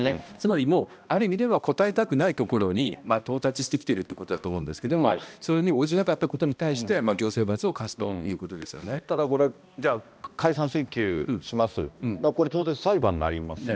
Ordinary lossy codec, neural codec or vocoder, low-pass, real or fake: none; codec, 16 kHz, 1 kbps, X-Codec, HuBERT features, trained on balanced general audio; none; fake